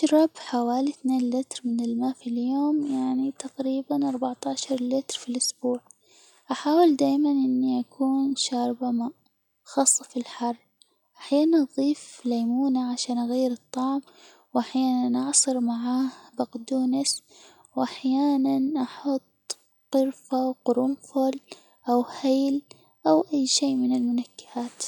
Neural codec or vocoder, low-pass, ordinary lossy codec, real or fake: none; 19.8 kHz; none; real